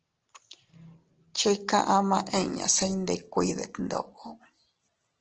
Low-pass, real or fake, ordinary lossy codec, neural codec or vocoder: 7.2 kHz; real; Opus, 16 kbps; none